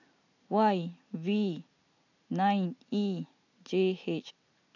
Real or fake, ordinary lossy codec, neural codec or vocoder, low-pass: real; none; none; 7.2 kHz